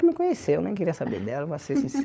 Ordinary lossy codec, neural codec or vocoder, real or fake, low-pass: none; codec, 16 kHz, 16 kbps, FunCodec, trained on LibriTTS, 50 frames a second; fake; none